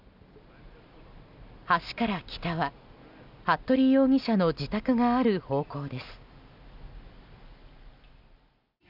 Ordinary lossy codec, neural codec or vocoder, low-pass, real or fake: none; none; 5.4 kHz; real